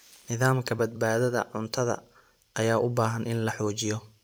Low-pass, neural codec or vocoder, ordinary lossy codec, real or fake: none; vocoder, 44.1 kHz, 128 mel bands every 256 samples, BigVGAN v2; none; fake